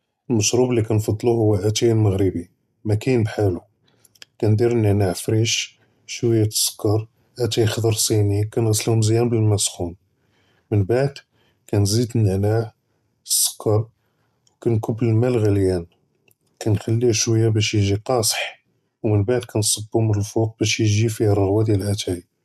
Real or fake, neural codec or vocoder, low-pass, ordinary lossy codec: real; none; 14.4 kHz; none